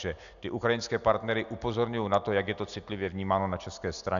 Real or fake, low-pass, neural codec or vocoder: real; 7.2 kHz; none